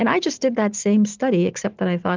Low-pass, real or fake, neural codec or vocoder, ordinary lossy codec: 7.2 kHz; real; none; Opus, 24 kbps